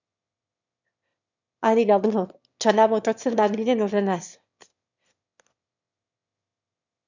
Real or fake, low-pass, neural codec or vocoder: fake; 7.2 kHz; autoencoder, 22.05 kHz, a latent of 192 numbers a frame, VITS, trained on one speaker